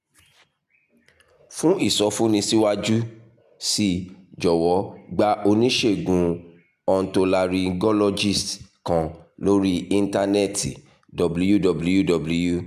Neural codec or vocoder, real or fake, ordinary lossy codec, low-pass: none; real; none; 14.4 kHz